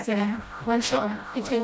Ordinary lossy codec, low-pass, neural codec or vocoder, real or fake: none; none; codec, 16 kHz, 0.5 kbps, FreqCodec, smaller model; fake